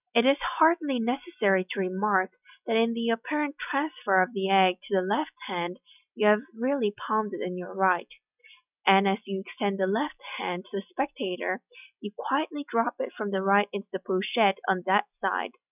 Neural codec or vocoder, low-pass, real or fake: none; 3.6 kHz; real